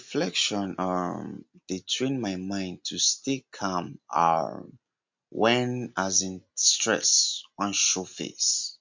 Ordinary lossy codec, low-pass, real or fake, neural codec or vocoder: MP3, 64 kbps; 7.2 kHz; real; none